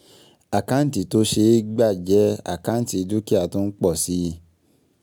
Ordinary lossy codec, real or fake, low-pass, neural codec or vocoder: none; real; none; none